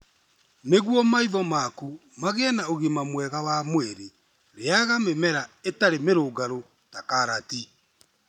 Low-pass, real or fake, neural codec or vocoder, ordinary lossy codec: 19.8 kHz; real; none; none